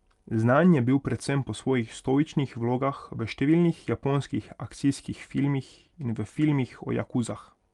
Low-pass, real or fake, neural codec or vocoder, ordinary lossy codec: 10.8 kHz; real; none; Opus, 32 kbps